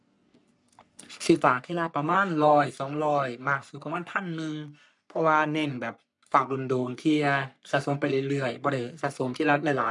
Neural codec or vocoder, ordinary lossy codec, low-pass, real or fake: codec, 44.1 kHz, 3.4 kbps, Pupu-Codec; none; 10.8 kHz; fake